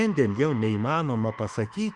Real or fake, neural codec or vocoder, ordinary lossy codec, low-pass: fake; autoencoder, 48 kHz, 32 numbers a frame, DAC-VAE, trained on Japanese speech; Opus, 64 kbps; 10.8 kHz